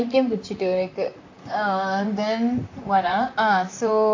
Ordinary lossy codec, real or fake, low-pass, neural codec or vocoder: AAC, 48 kbps; fake; 7.2 kHz; vocoder, 44.1 kHz, 128 mel bands, Pupu-Vocoder